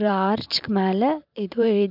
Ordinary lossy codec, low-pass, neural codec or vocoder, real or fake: none; 5.4 kHz; vocoder, 44.1 kHz, 128 mel bands every 512 samples, BigVGAN v2; fake